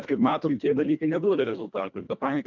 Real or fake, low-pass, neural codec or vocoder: fake; 7.2 kHz; codec, 24 kHz, 1.5 kbps, HILCodec